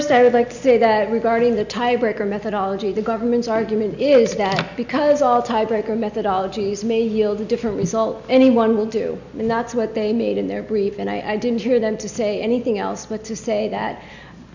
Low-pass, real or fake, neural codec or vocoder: 7.2 kHz; real; none